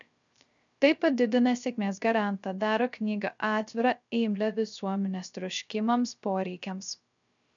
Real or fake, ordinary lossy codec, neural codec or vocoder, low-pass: fake; MP3, 96 kbps; codec, 16 kHz, 0.3 kbps, FocalCodec; 7.2 kHz